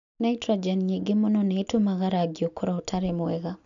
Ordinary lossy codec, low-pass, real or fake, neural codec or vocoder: none; 7.2 kHz; real; none